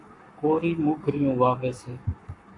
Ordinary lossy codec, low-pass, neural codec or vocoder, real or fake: MP3, 64 kbps; 10.8 kHz; codec, 44.1 kHz, 7.8 kbps, Pupu-Codec; fake